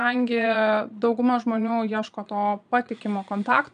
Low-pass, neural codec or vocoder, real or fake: 9.9 kHz; vocoder, 22.05 kHz, 80 mel bands, Vocos; fake